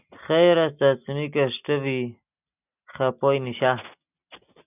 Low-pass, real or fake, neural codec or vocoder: 3.6 kHz; real; none